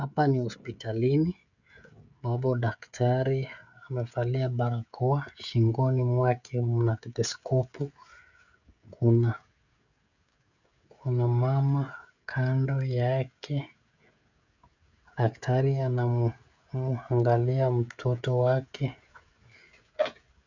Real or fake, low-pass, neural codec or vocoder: fake; 7.2 kHz; codec, 16 kHz, 16 kbps, FreqCodec, smaller model